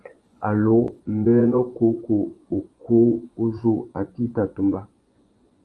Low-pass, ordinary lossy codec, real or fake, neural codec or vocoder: 10.8 kHz; Opus, 32 kbps; fake; vocoder, 24 kHz, 100 mel bands, Vocos